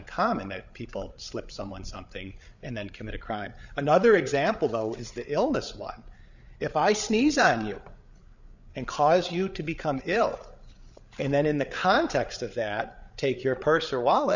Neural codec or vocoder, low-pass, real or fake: codec, 16 kHz, 8 kbps, FreqCodec, larger model; 7.2 kHz; fake